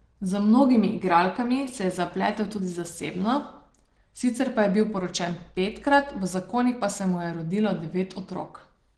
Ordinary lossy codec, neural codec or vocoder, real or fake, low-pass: Opus, 16 kbps; none; real; 9.9 kHz